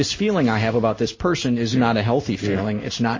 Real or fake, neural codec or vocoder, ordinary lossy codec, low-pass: fake; codec, 16 kHz in and 24 kHz out, 1 kbps, XY-Tokenizer; MP3, 32 kbps; 7.2 kHz